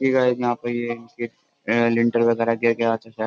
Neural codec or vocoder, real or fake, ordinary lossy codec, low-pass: none; real; none; none